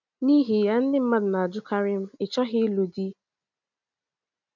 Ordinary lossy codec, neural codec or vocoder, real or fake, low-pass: none; none; real; 7.2 kHz